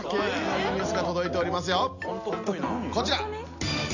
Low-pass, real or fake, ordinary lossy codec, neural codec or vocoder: 7.2 kHz; real; none; none